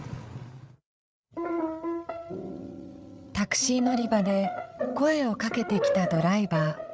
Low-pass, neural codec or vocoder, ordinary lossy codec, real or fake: none; codec, 16 kHz, 8 kbps, FreqCodec, larger model; none; fake